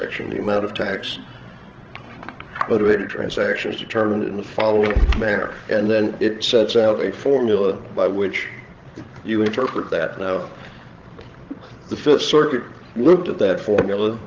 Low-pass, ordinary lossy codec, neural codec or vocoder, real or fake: 7.2 kHz; Opus, 16 kbps; codec, 16 kHz, 16 kbps, FreqCodec, larger model; fake